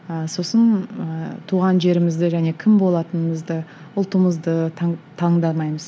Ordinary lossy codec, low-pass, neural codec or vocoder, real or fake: none; none; none; real